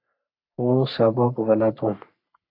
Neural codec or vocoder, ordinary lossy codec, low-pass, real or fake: codec, 44.1 kHz, 3.4 kbps, Pupu-Codec; AAC, 32 kbps; 5.4 kHz; fake